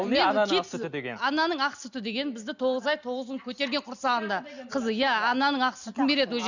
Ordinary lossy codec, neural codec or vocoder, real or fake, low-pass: none; none; real; 7.2 kHz